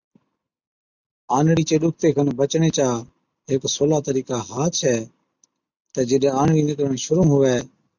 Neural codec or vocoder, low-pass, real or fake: none; 7.2 kHz; real